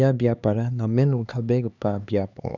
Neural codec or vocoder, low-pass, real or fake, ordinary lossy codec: codec, 16 kHz, 2 kbps, X-Codec, HuBERT features, trained on LibriSpeech; 7.2 kHz; fake; none